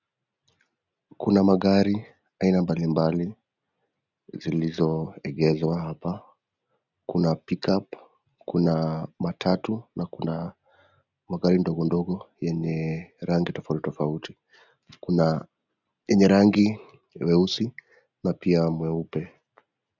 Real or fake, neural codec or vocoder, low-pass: real; none; 7.2 kHz